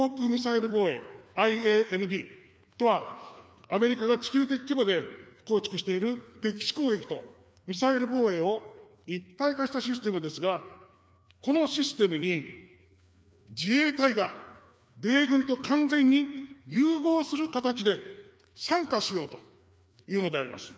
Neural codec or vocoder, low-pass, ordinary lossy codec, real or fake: codec, 16 kHz, 2 kbps, FreqCodec, larger model; none; none; fake